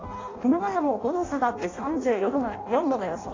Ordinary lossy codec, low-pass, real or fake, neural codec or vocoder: AAC, 32 kbps; 7.2 kHz; fake; codec, 16 kHz in and 24 kHz out, 0.6 kbps, FireRedTTS-2 codec